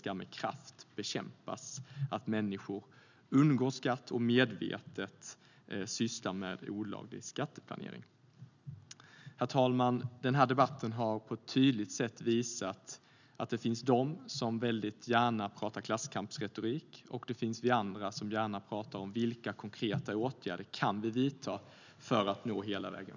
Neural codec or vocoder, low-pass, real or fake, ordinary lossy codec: none; 7.2 kHz; real; none